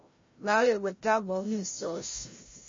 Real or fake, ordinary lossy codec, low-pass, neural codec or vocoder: fake; MP3, 32 kbps; 7.2 kHz; codec, 16 kHz, 0.5 kbps, FreqCodec, larger model